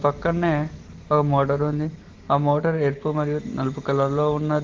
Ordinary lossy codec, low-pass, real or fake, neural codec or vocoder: Opus, 16 kbps; 7.2 kHz; real; none